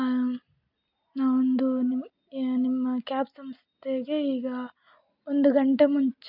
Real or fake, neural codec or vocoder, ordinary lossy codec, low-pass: real; none; none; 5.4 kHz